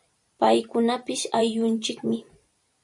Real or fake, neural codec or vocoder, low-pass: fake; vocoder, 44.1 kHz, 128 mel bands every 512 samples, BigVGAN v2; 10.8 kHz